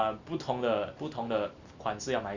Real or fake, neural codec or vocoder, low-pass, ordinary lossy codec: real; none; 7.2 kHz; Opus, 64 kbps